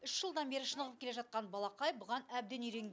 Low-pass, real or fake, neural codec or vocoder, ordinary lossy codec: none; real; none; none